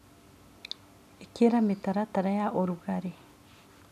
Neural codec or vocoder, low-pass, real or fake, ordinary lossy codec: none; 14.4 kHz; real; none